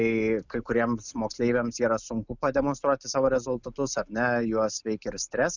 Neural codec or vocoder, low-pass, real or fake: none; 7.2 kHz; real